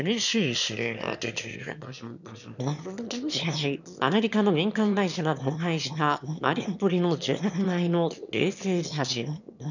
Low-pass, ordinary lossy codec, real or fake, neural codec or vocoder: 7.2 kHz; none; fake; autoencoder, 22.05 kHz, a latent of 192 numbers a frame, VITS, trained on one speaker